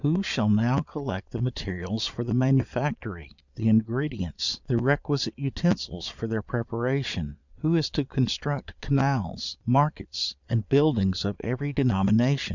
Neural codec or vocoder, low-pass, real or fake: vocoder, 22.05 kHz, 80 mel bands, Vocos; 7.2 kHz; fake